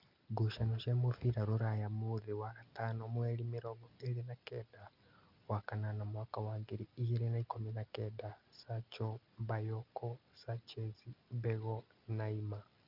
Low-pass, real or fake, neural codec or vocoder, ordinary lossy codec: 5.4 kHz; real; none; Opus, 64 kbps